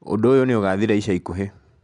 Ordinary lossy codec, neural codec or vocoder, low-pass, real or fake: none; none; 10.8 kHz; real